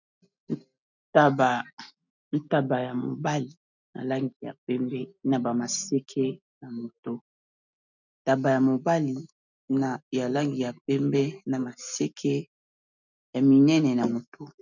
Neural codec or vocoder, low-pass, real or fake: none; 7.2 kHz; real